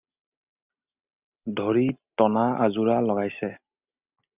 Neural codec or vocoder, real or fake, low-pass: none; real; 3.6 kHz